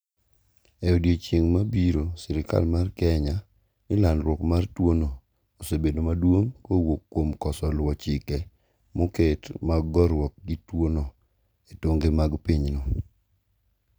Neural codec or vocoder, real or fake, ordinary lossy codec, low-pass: none; real; none; none